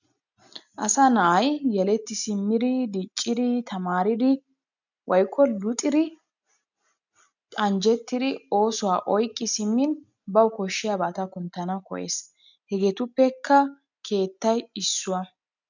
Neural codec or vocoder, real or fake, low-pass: none; real; 7.2 kHz